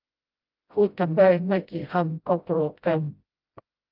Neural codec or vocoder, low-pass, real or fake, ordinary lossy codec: codec, 16 kHz, 0.5 kbps, FreqCodec, smaller model; 5.4 kHz; fake; Opus, 24 kbps